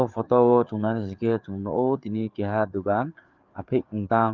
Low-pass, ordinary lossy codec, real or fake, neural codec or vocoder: 7.2 kHz; Opus, 32 kbps; fake; codec, 16 kHz, 4 kbps, FreqCodec, larger model